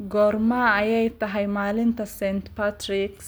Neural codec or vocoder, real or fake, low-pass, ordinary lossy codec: none; real; none; none